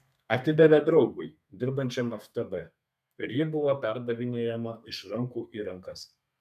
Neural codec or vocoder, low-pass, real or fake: codec, 32 kHz, 1.9 kbps, SNAC; 14.4 kHz; fake